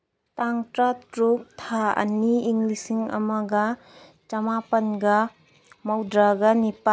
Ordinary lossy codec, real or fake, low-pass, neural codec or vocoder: none; real; none; none